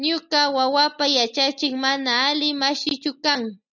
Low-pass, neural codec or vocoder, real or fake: 7.2 kHz; none; real